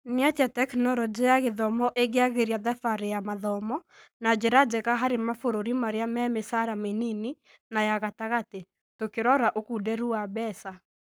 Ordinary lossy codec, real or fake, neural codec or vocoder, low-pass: none; fake; codec, 44.1 kHz, 7.8 kbps, Pupu-Codec; none